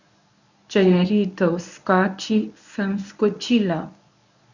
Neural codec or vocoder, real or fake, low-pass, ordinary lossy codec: codec, 24 kHz, 0.9 kbps, WavTokenizer, medium speech release version 1; fake; 7.2 kHz; none